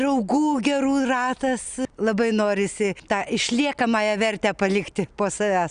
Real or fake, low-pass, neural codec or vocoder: real; 9.9 kHz; none